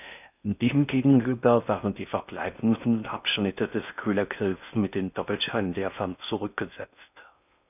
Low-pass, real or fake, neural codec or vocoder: 3.6 kHz; fake; codec, 16 kHz in and 24 kHz out, 0.6 kbps, FocalCodec, streaming, 4096 codes